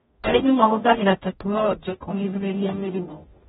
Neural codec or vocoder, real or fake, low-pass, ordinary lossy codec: codec, 44.1 kHz, 0.9 kbps, DAC; fake; 19.8 kHz; AAC, 16 kbps